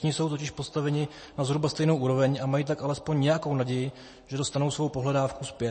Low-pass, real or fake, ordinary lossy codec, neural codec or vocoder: 10.8 kHz; real; MP3, 32 kbps; none